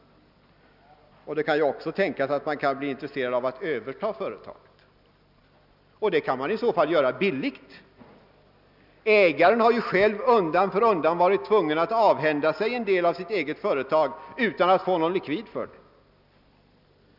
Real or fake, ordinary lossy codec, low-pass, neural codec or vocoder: real; none; 5.4 kHz; none